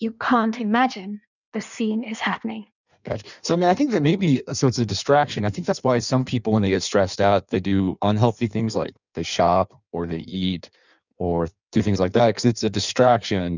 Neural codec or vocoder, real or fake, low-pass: codec, 16 kHz in and 24 kHz out, 1.1 kbps, FireRedTTS-2 codec; fake; 7.2 kHz